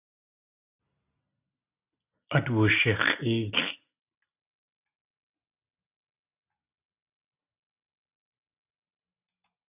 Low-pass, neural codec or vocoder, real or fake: 3.6 kHz; none; real